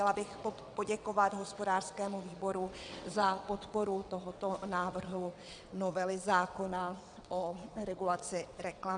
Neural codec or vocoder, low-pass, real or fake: vocoder, 22.05 kHz, 80 mel bands, WaveNeXt; 9.9 kHz; fake